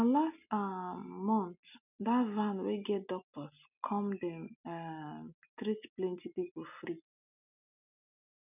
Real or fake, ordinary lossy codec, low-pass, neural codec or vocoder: real; none; 3.6 kHz; none